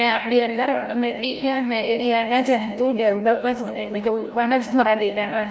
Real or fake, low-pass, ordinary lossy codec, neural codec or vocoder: fake; none; none; codec, 16 kHz, 0.5 kbps, FreqCodec, larger model